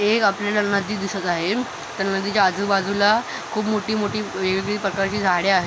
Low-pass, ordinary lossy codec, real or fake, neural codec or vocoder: none; none; real; none